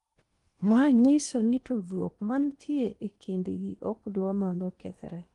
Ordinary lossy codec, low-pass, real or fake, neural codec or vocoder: Opus, 24 kbps; 10.8 kHz; fake; codec, 16 kHz in and 24 kHz out, 0.8 kbps, FocalCodec, streaming, 65536 codes